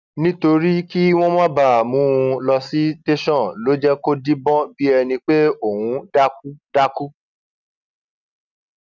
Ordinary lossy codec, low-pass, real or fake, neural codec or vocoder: none; 7.2 kHz; real; none